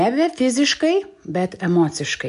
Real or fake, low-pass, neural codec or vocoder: real; 10.8 kHz; none